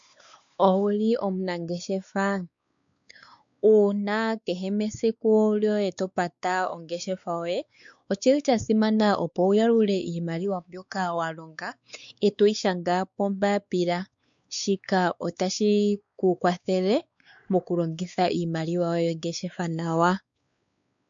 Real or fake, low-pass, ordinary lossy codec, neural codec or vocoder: fake; 7.2 kHz; MP3, 64 kbps; codec, 16 kHz, 4 kbps, X-Codec, WavLM features, trained on Multilingual LibriSpeech